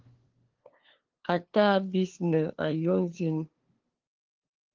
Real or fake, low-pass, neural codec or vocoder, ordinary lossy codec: fake; 7.2 kHz; codec, 16 kHz, 2 kbps, FunCodec, trained on LibriTTS, 25 frames a second; Opus, 16 kbps